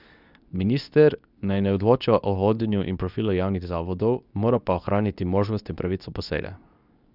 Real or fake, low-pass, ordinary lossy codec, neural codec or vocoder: fake; 5.4 kHz; none; codec, 24 kHz, 0.9 kbps, WavTokenizer, medium speech release version 2